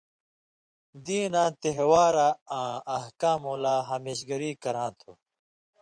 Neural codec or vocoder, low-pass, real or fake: vocoder, 24 kHz, 100 mel bands, Vocos; 9.9 kHz; fake